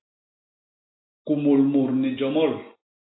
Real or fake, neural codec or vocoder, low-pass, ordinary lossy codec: real; none; 7.2 kHz; AAC, 16 kbps